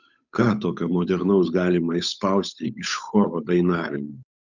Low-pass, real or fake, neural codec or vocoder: 7.2 kHz; fake; codec, 16 kHz, 8 kbps, FunCodec, trained on Chinese and English, 25 frames a second